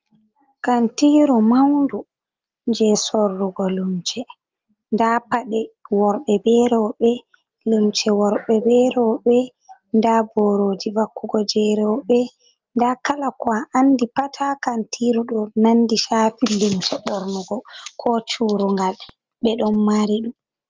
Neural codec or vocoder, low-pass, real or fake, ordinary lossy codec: none; 7.2 kHz; real; Opus, 24 kbps